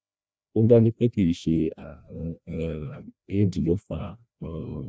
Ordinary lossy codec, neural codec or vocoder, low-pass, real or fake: none; codec, 16 kHz, 1 kbps, FreqCodec, larger model; none; fake